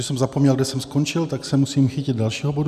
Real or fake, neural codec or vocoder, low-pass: real; none; 14.4 kHz